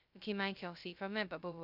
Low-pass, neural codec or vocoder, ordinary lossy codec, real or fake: 5.4 kHz; codec, 16 kHz, 0.2 kbps, FocalCodec; none; fake